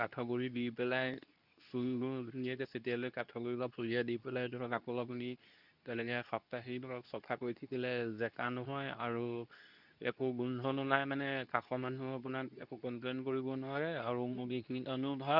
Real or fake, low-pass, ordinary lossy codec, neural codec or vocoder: fake; 5.4 kHz; none; codec, 24 kHz, 0.9 kbps, WavTokenizer, medium speech release version 2